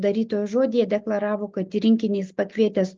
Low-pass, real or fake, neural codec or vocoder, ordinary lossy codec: 7.2 kHz; real; none; Opus, 24 kbps